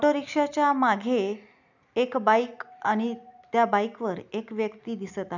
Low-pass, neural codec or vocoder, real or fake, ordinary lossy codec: 7.2 kHz; none; real; none